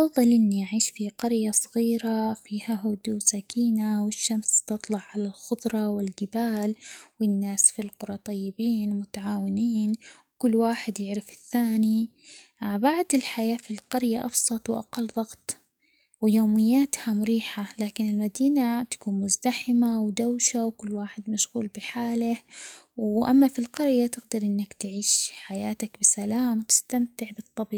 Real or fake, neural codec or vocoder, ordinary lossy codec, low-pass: fake; codec, 44.1 kHz, 7.8 kbps, DAC; none; none